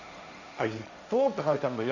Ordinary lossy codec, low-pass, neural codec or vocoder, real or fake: none; 7.2 kHz; codec, 16 kHz, 1.1 kbps, Voila-Tokenizer; fake